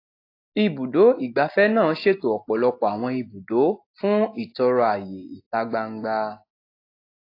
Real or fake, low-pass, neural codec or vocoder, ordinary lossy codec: real; 5.4 kHz; none; AAC, 32 kbps